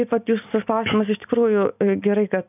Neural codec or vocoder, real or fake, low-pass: none; real; 3.6 kHz